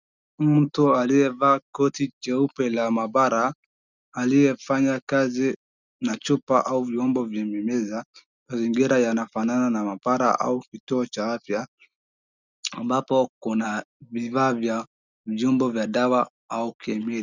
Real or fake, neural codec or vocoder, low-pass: real; none; 7.2 kHz